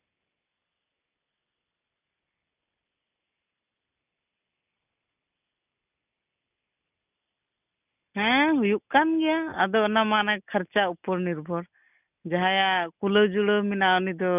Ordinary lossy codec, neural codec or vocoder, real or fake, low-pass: none; none; real; 3.6 kHz